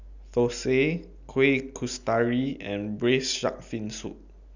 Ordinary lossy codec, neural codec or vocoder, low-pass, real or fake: none; none; 7.2 kHz; real